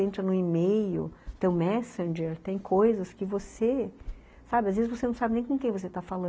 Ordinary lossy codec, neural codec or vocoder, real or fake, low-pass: none; none; real; none